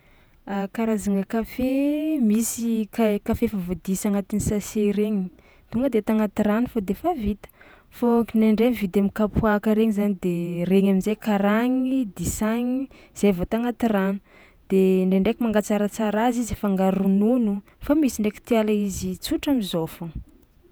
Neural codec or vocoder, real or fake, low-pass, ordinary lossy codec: vocoder, 48 kHz, 128 mel bands, Vocos; fake; none; none